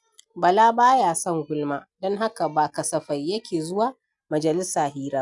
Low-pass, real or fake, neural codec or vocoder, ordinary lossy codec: 10.8 kHz; real; none; none